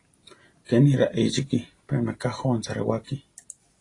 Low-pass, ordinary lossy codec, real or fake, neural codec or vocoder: 10.8 kHz; AAC, 32 kbps; real; none